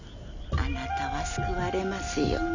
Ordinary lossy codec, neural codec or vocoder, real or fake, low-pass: none; none; real; 7.2 kHz